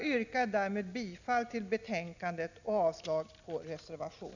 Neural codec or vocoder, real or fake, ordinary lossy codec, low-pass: none; real; none; 7.2 kHz